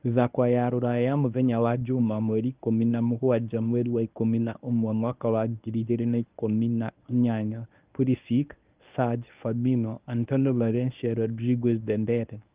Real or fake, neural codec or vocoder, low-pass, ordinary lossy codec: fake; codec, 24 kHz, 0.9 kbps, WavTokenizer, medium speech release version 1; 3.6 kHz; Opus, 32 kbps